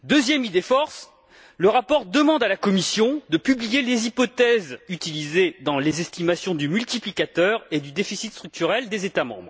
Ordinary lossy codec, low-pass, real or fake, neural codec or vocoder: none; none; real; none